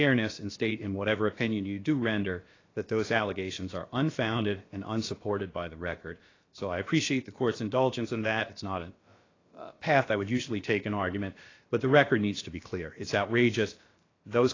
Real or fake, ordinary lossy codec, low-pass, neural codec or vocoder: fake; AAC, 32 kbps; 7.2 kHz; codec, 16 kHz, about 1 kbps, DyCAST, with the encoder's durations